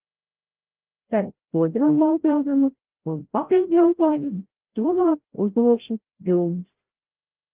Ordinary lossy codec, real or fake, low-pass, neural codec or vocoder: Opus, 16 kbps; fake; 3.6 kHz; codec, 16 kHz, 0.5 kbps, FreqCodec, larger model